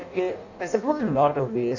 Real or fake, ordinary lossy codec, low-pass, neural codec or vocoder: fake; none; 7.2 kHz; codec, 16 kHz in and 24 kHz out, 0.6 kbps, FireRedTTS-2 codec